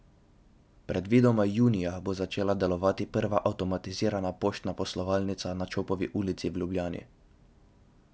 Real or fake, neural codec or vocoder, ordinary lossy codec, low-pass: real; none; none; none